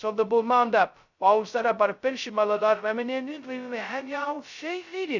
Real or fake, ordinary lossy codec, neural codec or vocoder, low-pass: fake; none; codec, 16 kHz, 0.2 kbps, FocalCodec; 7.2 kHz